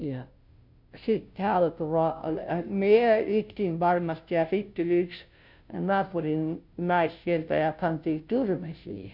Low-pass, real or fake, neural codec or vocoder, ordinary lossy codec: 5.4 kHz; fake; codec, 16 kHz, 0.5 kbps, FunCodec, trained on Chinese and English, 25 frames a second; none